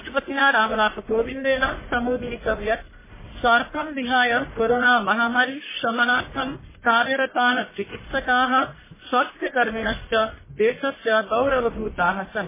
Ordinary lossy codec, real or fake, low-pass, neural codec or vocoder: MP3, 16 kbps; fake; 3.6 kHz; codec, 44.1 kHz, 1.7 kbps, Pupu-Codec